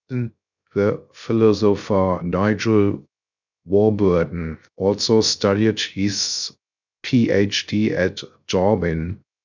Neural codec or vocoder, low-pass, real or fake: codec, 16 kHz, 0.3 kbps, FocalCodec; 7.2 kHz; fake